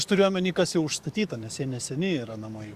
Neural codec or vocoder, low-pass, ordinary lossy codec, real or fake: codec, 44.1 kHz, 7.8 kbps, DAC; 14.4 kHz; Opus, 64 kbps; fake